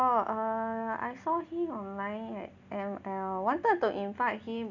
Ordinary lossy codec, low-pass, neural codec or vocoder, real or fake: none; 7.2 kHz; none; real